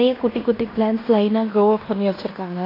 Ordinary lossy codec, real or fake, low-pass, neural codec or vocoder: AAC, 24 kbps; fake; 5.4 kHz; codec, 16 kHz in and 24 kHz out, 0.9 kbps, LongCat-Audio-Codec, fine tuned four codebook decoder